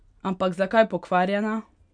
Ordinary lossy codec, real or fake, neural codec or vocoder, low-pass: none; real; none; 9.9 kHz